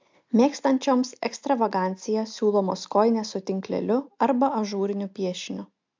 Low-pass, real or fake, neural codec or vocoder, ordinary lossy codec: 7.2 kHz; real; none; MP3, 64 kbps